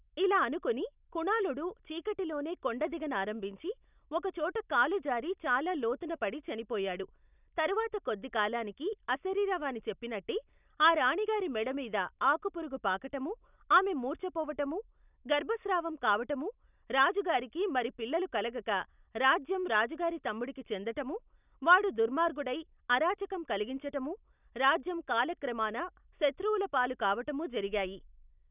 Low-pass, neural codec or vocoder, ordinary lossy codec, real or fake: 3.6 kHz; none; none; real